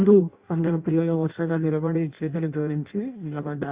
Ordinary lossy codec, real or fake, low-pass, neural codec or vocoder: Opus, 64 kbps; fake; 3.6 kHz; codec, 16 kHz in and 24 kHz out, 0.6 kbps, FireRedTTS-2 codec